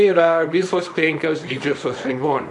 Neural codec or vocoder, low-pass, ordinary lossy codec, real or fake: codec, 24 kHz, 0.9 kbps, WavTokenizer, small release; 10.8 kHz; AAC, 64 kbps; fake